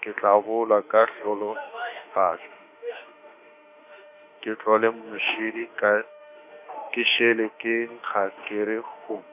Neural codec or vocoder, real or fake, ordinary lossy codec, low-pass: autoencoder, 48 kHz, 32 numbers a frame, DAC-VAE, trained on Japanese speech; fake; none; 3.6 kHz